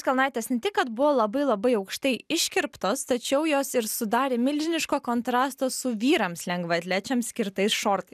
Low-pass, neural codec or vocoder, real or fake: 14.4 kHz; none; real